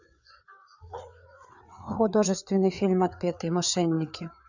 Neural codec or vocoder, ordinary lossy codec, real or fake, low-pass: codec, 16 kHz, 4 kbps, FreqCodec, larger model; none; fake; 7.2 kHz